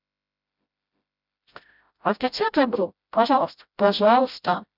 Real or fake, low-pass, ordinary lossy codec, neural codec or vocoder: fake; 5.4 kHz; none; codec, 16 kHz, 0.5 kbps, FreqCodec, smaller model